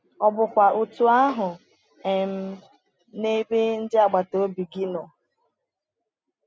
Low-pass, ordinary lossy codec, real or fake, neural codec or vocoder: none; none; real; none